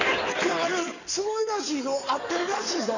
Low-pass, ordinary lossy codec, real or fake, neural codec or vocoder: 7.2 kHz; none; fake; codec, 16 kHz in and 24 kHz out, 2.2 kbps, FireRedTTS-2 codec